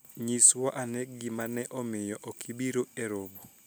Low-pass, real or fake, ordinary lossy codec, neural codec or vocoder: none; real; none; none